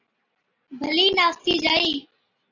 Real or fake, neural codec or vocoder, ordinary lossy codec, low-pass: real; none; AAC, 48 kbps; 7.2 kHz